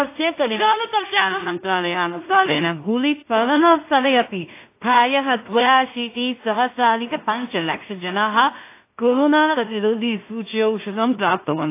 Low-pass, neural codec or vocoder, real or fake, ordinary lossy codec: 3.6 kHz; codec, 16 kHz in and 24 kHz out, 0.4 kbps, LongCat-Audio-Codec, two codebook decoder; fake; AAC, 24 kbps